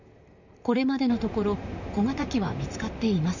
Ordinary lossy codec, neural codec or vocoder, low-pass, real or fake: none; vocoder, 44.1 kHz, 128 mel bands, Pupu-Vocoder; 7.2 kHz; fake